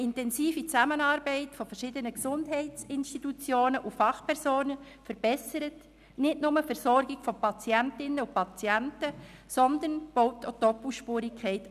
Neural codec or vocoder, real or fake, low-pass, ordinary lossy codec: none; real; 14.4 kHz; none